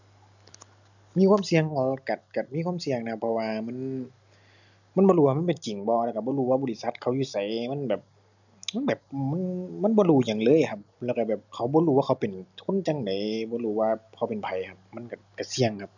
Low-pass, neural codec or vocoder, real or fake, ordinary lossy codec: 7.2 kHz; none; real; none